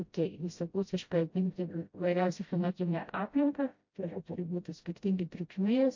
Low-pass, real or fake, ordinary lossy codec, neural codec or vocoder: 7.2 kHz; fake; MP3, 48 kbps; codec, 16 kHz, 0.5 kbps, FreqCodec, smaller model